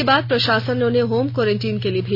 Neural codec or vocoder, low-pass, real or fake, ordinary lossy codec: none; 5.4 kHz; real; none